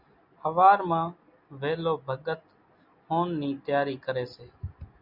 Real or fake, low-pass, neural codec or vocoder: real; 5.4 kHz; none